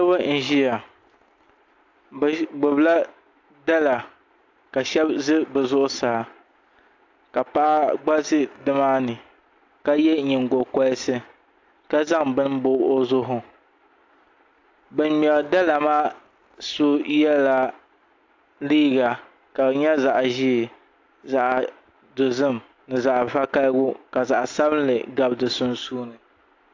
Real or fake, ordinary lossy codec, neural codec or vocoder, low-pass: real; AAC, 48 kbps; none; 7.2 kHz